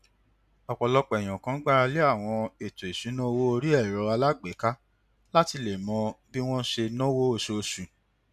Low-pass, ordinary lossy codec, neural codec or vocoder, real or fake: 14.4 kHz; none; none; real